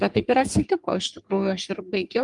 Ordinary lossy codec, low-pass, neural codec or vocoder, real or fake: Opus, 32 kbps; 10.8 kHz; codec, 44.1 kHz, 3.4 kbps, Pupu-Codec; fake